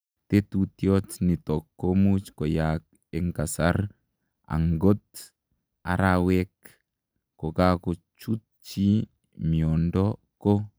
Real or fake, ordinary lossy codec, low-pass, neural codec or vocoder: real; none; none; none